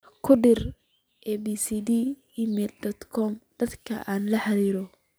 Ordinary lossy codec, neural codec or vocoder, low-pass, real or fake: none; none; none; real